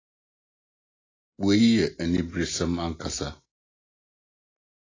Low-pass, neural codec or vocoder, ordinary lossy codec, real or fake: 7.2 kHz; none; AAC, 32 kbps; real